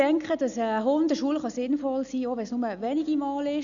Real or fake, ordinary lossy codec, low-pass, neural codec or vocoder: real; none; 7.2 kHz; none